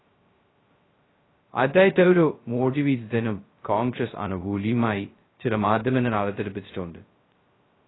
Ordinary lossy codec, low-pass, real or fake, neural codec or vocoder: AAC, 16 kbps; 7.2 kHz; fake; codec, 16 kHz, 0.2 kbps, FocalCodec